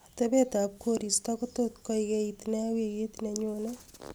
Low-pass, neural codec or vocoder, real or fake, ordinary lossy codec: none; none; real; none